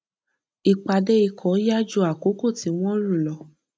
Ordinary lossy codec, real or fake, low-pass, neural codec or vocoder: none; real; none; none